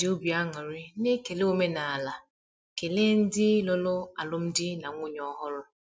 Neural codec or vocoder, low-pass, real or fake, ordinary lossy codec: none; none; real; none